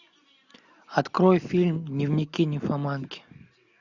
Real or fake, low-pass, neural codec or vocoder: real; 7.2 kHz; none